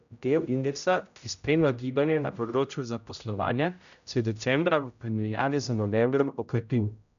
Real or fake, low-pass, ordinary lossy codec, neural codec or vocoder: fake; 7.2 kHz; none; codec, 16 kHz, 0.5 kbps, X-Codec, HuBERT features, trained on general audio